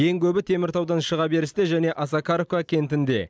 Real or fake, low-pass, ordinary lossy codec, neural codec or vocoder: real; none; none; none